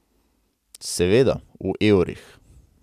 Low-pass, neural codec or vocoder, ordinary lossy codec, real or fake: 14.4 kHz; none; none; real